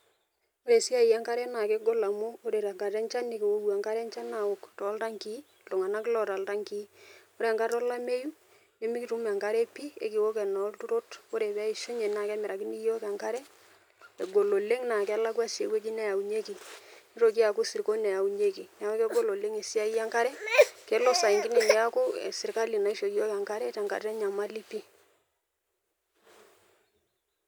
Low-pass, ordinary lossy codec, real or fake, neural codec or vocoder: none; none; real; none